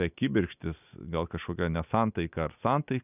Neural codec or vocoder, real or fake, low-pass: none; real; 3.6 kHz